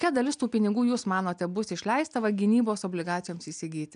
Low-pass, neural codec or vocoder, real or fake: 9.9 kHz; none; real